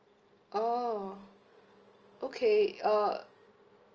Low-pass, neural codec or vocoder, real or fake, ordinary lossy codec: 7.2 kHz; none; real; Opus, 24 kbps